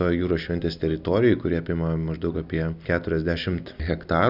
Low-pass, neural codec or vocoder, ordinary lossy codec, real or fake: 5.4 kHz; none; Opus, 64 kbps; real